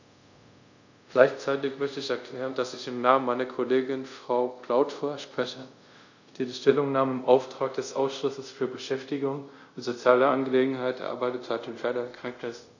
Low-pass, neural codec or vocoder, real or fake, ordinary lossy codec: 7.2 kHz; codec, 24 kHz, 0.5 kbps, DualCodec; fake; none